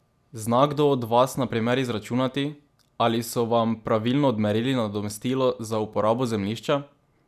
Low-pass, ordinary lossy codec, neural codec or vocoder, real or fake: 14.4 kHz; none; none; real